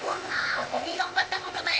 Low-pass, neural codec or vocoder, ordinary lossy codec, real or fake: none; codec, 16 kHz, 0.8 kbps, ZipCodec; none; fake